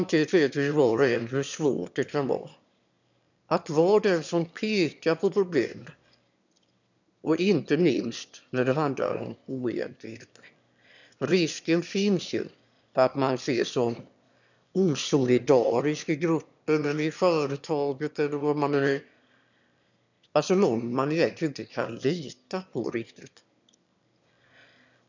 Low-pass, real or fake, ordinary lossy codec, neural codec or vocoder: 7.2 kHz; fake; none; autoencoder, 22.05 kHz, a latent of 192 numbers a frame, VITS, trained on one speaker